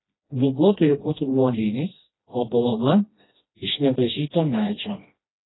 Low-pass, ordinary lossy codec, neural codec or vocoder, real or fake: 7.2 kHz; AAC, 16 kbps; codec, 16 kHz, 1 kbps, FreqCodec, smaller model; fake